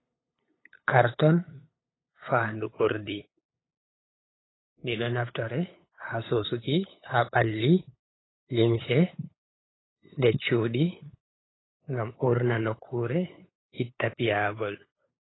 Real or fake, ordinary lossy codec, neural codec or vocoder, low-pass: fake; AAC, 16 kbps; codec, 16 kHz, 8 kbps, FunCodec, trained on LibriTTS, 25 frames a second; 7.2 kHz